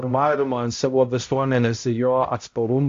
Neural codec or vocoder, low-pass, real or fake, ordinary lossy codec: codec, 16 kHz, 0.5 kbps, X-Codec, HuBERT features, trained on balanced general audio; 7.2 kHz; fake; AAC, 48 kbps